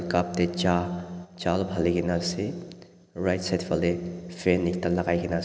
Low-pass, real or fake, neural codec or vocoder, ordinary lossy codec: none; real; none; none